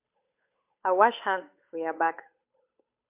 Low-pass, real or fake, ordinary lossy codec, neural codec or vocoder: 3.6 kHz; fake; MP3, 32 kbps; codec, 16 kHz, 8 kbps, FunCodec, trained on Chinese and English, 25 frames a second